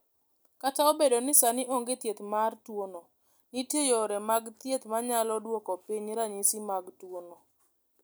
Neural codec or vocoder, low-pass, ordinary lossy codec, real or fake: none; none; none; real